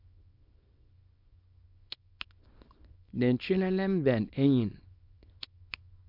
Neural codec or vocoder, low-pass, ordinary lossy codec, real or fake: codec, 24 kHz, 0.9 kbps, WavTokenizer, small release; 5.4 kHz; MP3, 48 kbps; fake